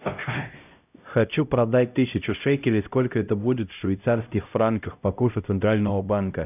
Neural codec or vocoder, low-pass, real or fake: codec, 16 kHz, 0.5 kbps, X-Codec, HuBERT features, trained on LibriSpeech; 3.6 kHz; fake